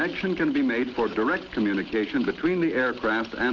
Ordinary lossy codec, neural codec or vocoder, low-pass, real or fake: Opus, 16 kbps; none; 7.2 kHz; real